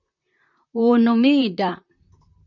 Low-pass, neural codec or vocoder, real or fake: 7.2 kHz; vocoder, 44.1 kHz, 128 mel bands, Pupu-Vocoder; fake